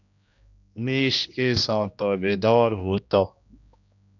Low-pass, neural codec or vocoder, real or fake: 7.2 kHz; codec, 16 kHz, 1 kbps, X-Codec, HuBERT features, trained on general audio; fake